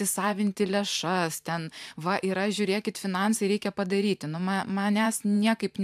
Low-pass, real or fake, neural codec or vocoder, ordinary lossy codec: 14.4 kHz; fake; vocoder, 44.1 kHz, 128 mel bands every 512 samples, BigVGAN v2; AAC, 96 kbps